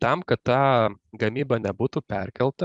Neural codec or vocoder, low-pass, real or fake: vocoder, 44.1 kHz, 128 mel bands every 256 samples, BigVGAN v2; 10.8 kHz; fake